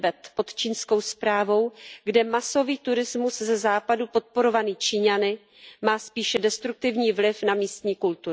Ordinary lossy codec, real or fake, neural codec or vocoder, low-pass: none; real; none; none